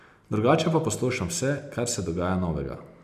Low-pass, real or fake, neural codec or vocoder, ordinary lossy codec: 14.4 kHz; real; none; MP3, 96 kbps